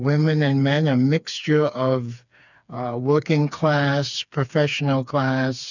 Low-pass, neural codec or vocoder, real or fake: 7.2 kHz; codec, 16 kHz, 4 kbps, FreqCodec, smaller model; fake